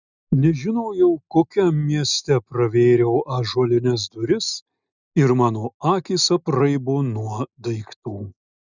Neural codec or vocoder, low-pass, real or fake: none; 7.2 kHz; real